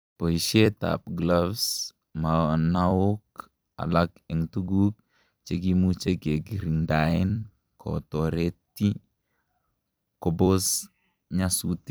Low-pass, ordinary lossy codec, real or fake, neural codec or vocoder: none; none; fake; vocoder, 44.1 kHz, 128 mel bands every 256 samples, BigVGAN v2